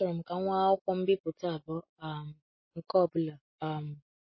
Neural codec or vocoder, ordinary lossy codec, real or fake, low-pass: none; MP3, 24 kbps; real; 7.2 kHz